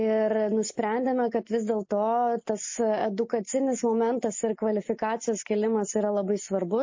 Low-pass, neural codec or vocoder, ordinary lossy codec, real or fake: 7.2 kHz; none; MP3, 32 kbps; real